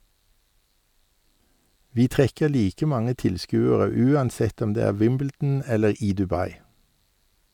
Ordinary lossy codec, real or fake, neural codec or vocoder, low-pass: none; real; none; 19.8 kHz